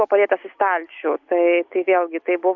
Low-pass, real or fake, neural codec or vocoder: 7.2 kHz; real; none